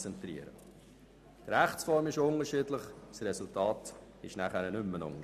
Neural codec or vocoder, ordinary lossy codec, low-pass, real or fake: none; none; 14.4 kHz; real